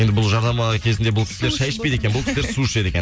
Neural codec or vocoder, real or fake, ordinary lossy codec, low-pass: none; real; none; none